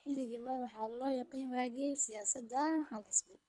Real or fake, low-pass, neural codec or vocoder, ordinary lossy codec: fake; 10.8 kHz; codec, 24 kHz, 3 kbps, HILCodec; none